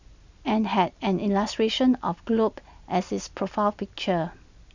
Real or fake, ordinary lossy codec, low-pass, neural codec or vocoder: real; none; 7.2 kHz; none